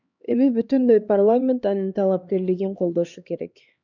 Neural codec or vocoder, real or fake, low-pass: codec, 16 kHz, 2 kbps, X-Codec, HuBERT features, trained on LibriSpeech; fake; 7.2 kHz